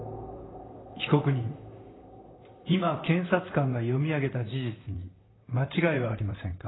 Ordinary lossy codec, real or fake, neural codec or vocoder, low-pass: AAC, 16 kbps; fake; vocoder, 44.1 kHz, 128 mel bands, Pupu-Vocoder; 7.2 kHz